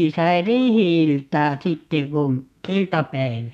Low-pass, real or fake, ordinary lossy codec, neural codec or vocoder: 14.4 kHz; fake; none; codec, 32 kHz, 1.9 kbps, SNAC